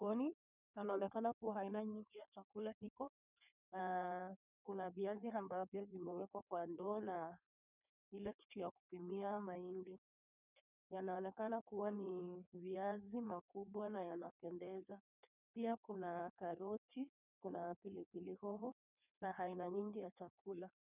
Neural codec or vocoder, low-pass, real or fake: codec, 16 kHz in and 24 kHz out, 1.1 kbps, FireRedTTS-2 codec; 3.6 kHz; fake